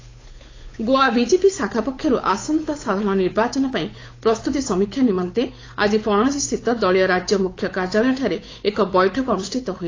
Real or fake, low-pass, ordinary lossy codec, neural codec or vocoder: fake; 7.2 kHz; AAC, 32 kbps; codec, 16 kHz, 8 kbps, FunCodec, trained on Chinese and English, 25 frames a second